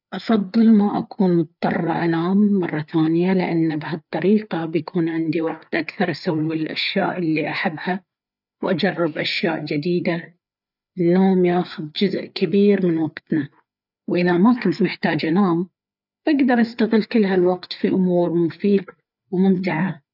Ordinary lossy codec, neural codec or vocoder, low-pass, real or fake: none; codec, 16 kHz, 4 kbps, FreqCodec, larger model; 5.4 kHz; fake